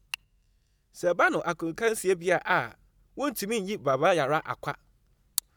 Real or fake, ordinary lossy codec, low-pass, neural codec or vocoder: real; none; none; none